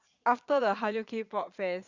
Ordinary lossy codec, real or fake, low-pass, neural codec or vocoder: Opus, 64 kbps; fake; 7.2 kHz; vocoder, 22.05 kHz, 80 mel bands, Vocos